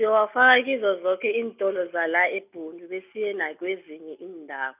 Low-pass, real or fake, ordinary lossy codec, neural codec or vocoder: 3.6 kHz; real; none; none